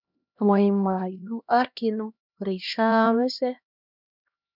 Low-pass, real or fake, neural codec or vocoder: 5.4 kHz; fake; codec, 16 kHz, 1 kbps, X-Codec, HuBERT features, trained on LibriSpeech